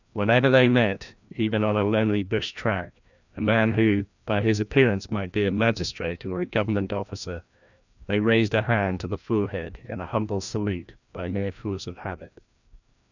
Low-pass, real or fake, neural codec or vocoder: 7.2 kHz; fake; codec, 16 kHz, 1 kbps, FreqCodec, larger model